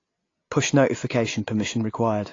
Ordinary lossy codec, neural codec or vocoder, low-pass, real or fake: AAC, 32 kbps; none; 7.2 kHz; real